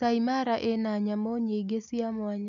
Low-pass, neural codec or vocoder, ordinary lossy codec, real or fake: 7.2 kHz; none; none; real